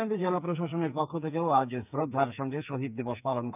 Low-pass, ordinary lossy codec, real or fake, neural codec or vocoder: 3.6 kHz; none; fake; codec, 44.1 kHz, 2.6 kbps, SNAC